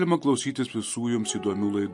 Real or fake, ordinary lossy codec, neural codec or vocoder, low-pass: real; MP3, 48 kbps; none; 10.8 kHz